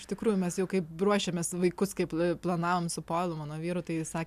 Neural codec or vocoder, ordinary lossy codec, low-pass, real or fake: none; MP3, 96 kbps; 14.4 kHz; real